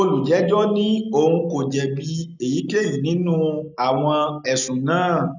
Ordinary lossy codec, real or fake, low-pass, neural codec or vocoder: none; real; 7.2 kHz; none